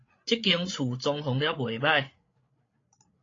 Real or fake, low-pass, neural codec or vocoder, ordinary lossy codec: fake; 7.2 kHz; codec, 16 kHz, 8 kbps, FreqCodec, larger model; AAC, 32 kbps